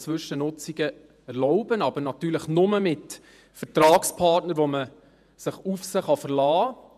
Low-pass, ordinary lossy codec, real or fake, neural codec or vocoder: 14.4 kHz; none; fake; vocoder, 48 kHz, 128 mel bands, Vocos